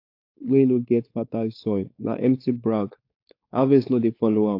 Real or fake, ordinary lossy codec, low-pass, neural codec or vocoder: fake; AAC, 32 kbps; 5.4 kHz; codec, 16 kHz, 4.8 kbps, FACodec